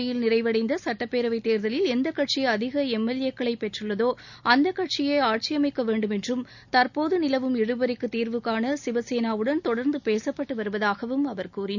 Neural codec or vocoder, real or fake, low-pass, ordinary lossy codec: none; real; 7.2 kHz; none